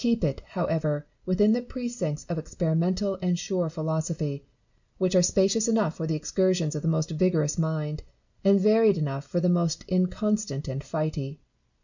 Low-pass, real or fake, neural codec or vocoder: 7.2 kHz; real; none